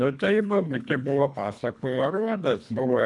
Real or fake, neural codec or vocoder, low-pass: fake; codec, 24 kHz, 1.5 kbps, HILCodec; 10.8 kHz